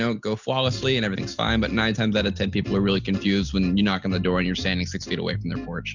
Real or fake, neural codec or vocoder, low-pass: real; none; 7.2 kHz